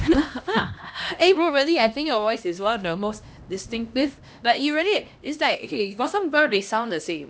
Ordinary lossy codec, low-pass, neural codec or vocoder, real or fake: none; none; codec, 16 kHz, 1 kbps, X-Codec, HuBERT features, trained on LibriSpeech; fake